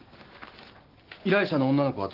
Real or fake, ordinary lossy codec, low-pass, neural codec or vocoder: real; Opus, 16 kbps; 5.4 kHz; none